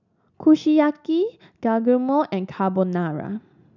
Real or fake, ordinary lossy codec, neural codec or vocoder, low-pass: real; none; none; 7.2 kHz